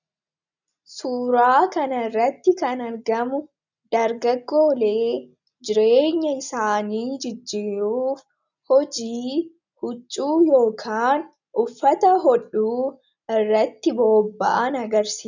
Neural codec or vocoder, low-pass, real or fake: none; 7.2 kHz; real